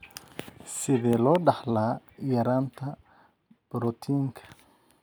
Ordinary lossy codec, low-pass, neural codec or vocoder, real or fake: none; none; none; real